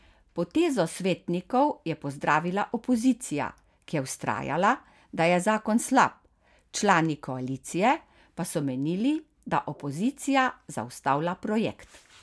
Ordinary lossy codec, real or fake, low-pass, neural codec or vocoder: none; real; none; none